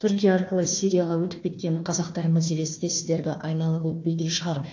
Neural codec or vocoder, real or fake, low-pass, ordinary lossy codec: codec, 16 kHz, 1 kbps, FunCodec, trained on Chinese and English, 50 frames a second; fake; 7.2 kHz; AAC, 48 kbps